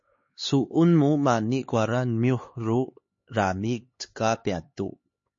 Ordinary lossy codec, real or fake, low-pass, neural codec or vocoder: MP3, 32 kbps; fake; 7.2 kHz; codec, 16 kHz, 4 kbps, X-Codec, HuBERT features, trained on LibriSpeech